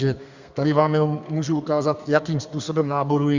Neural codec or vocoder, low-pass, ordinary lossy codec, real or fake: codec, 32 kHz, 1.9 kbps, SNAC; 7.2 kHz; Opus, 64 kbps; fake